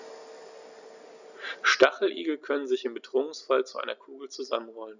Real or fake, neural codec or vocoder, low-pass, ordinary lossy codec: real; none; 7.2 kHz; none